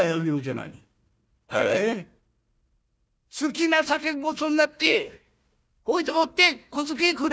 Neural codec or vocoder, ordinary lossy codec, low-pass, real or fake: codec, 16 kHz, 1 kbps, FunCodec, trained on Chinese and English, 50 frames a second; none; none; fake